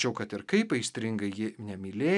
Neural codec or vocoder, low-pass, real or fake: none; 10.8 kHz; real